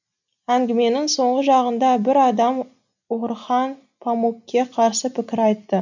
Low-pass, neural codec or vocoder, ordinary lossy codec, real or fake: 7.2 kHz; none; none; real